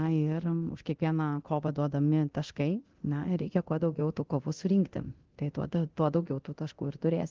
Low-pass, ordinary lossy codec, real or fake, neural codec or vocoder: 7.2 kHz; Opus, 16 kbps; fake; codec, 24 kHz, 0.9 kbps, DualCodec